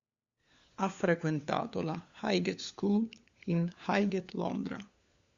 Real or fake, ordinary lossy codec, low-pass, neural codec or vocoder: fake; Opus, 64 kbps; 7.2 kHz; codec, 16 kHz, 4 kbps, FunCodec, trained on LibriTTS, 50 frames a second